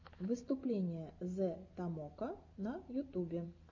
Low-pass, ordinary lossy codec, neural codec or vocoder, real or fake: 7.2 kHz; MP3, 48 kbps; none; real